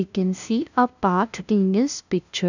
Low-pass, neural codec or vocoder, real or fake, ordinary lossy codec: 7.2 kHz; codec, 16 kHz, 0.5 kbps, FunCodec, trained on LibriTTS, 25 frames a second; fake; none